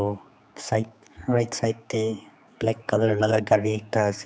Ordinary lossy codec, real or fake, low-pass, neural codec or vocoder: none; fake; none; codec, 16 kHz, 4 kbps, X-Codec, HuBERT features, trained on general audio